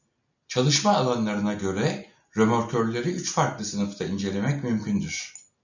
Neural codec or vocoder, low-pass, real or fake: none; 7.2 kHz; real